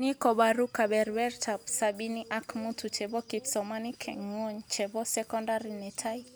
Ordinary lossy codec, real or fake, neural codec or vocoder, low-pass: none; real; none; none